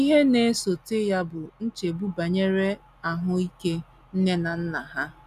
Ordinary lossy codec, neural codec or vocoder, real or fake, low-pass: none; none; real; 14.4 kHz